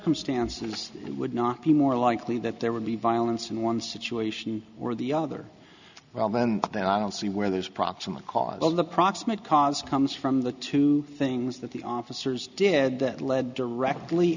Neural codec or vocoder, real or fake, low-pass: none; real; 7.2 kHz